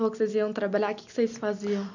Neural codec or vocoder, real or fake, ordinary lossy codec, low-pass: none; real; none; 7.2 kHz